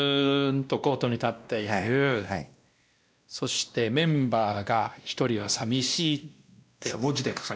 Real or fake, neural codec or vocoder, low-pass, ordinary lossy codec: fake; codec, 16 kHz, 1 kbps, X-Codec, WavLM features, trained on Multilingual LibriSpeech; none; none